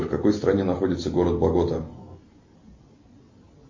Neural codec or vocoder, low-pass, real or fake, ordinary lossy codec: none; 7.2 kHz; real; MP3, 32 kbps